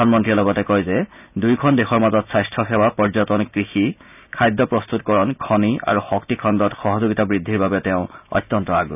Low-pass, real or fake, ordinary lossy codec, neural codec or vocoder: 3.6 kHz; real; none; none